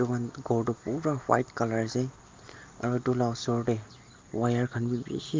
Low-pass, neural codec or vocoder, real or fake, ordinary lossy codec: 7.2 kHz; none; real; Opus, 32 kbps